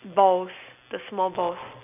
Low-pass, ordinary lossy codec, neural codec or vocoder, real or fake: 3.6 kHz; none; none; real